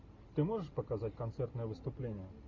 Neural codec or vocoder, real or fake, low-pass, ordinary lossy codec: none; real; 7.2 kHz; MP3, 64 kbps